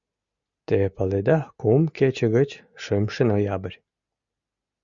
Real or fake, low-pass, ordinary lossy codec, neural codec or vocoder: real; 7.2 kHz; Opus, 64 kbps; none